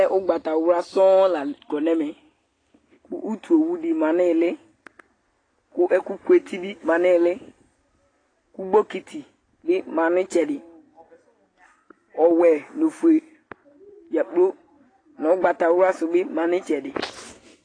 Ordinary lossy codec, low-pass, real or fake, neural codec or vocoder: AAC, 32 kbps; 9.9 kHz; real; none